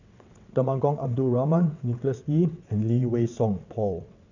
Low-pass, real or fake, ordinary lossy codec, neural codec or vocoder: 7.2 kHz; fake; none; vocoder, 22.05 kHz, 80 mel bands, WaveNeXt